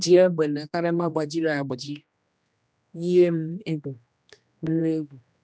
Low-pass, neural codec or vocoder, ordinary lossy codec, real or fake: none; codec, 16 kHz, 1 kbps, X-Codec, HuBERT features, trained on general audio; none; fake